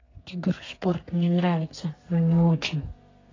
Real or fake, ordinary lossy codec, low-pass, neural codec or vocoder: fake; AAC, 32 kbps; 7.2 kHz; codec, 32 kHz, 1.9 kbps, SNAC